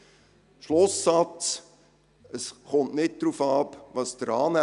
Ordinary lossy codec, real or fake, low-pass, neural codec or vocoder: none; real; 10.8 kHz; none